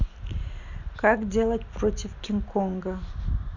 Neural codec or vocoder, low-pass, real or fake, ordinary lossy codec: none; 7.2 kHz; real; none